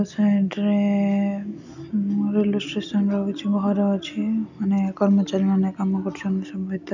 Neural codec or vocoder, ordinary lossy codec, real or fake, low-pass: none; none; real; 7.2 kHz